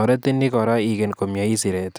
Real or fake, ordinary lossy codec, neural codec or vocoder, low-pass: real; none; none; none